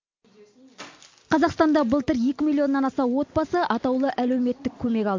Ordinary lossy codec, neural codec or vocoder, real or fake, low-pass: MP3, 48 kbps; none; real; 7.2 kHz